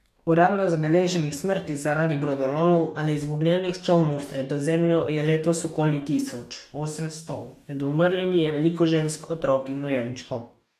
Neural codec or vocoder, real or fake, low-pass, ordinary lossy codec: codec, 44.1 kHz, 2.6 kbps, DAC; fake; 14.4 kHz; none